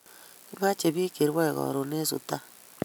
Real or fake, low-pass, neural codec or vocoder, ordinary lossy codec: real; none; none; none